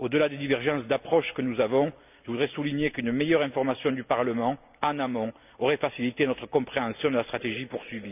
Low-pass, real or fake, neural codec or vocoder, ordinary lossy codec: 3.6 kHz; real; none; none